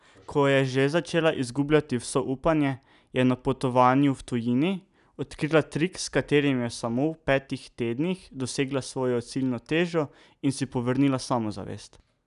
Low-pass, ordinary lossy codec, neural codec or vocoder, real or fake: 10.8 kHz; none; none; real